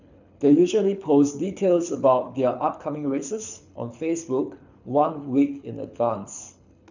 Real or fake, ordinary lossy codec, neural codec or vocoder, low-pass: fake; none; codec, 24 kHz, 6 kbps, HILCodec; 7.2 kHz